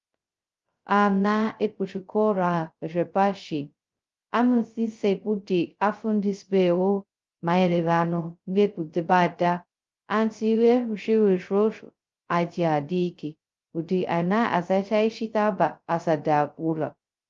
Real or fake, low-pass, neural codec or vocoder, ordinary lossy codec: fake; 7.2 kHz; codec, 16 kHz, 0.2 kbps, FocalCodec; Opus, 32 kbps